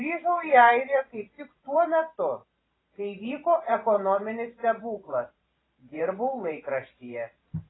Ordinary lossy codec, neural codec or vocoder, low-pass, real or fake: AAC, 16 kbps; vocoder, 44.1 kHz, 128 mel bands every 512 samples, BigVGAN v2; 7.2 kHz; fake